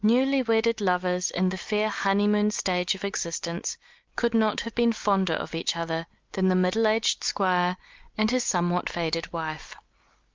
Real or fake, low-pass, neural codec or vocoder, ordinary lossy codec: real; 7.2 kHz; none; Opus, 32 kbps